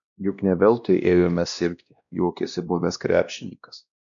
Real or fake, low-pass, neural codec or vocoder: fake; 7.2 kHz; codec, 16 kHz, 1 kbps, X-Codec, WavLM features, trained on Multilingual LibriSpeech